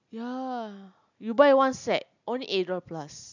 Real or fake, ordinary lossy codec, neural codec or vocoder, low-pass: real; none; none; 7.2 kHz